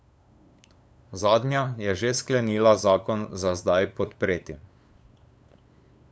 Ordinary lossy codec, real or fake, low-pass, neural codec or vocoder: none; fake; none; codec, 16 kHz, 8 kbps, FunCodec, trained on LibriTTS, 25 frames a second